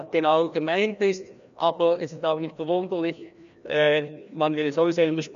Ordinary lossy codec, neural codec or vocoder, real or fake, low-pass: none; codec, 16 kHz, 1 kbps, FreqCodec, larger model; fake; 7.2 kHz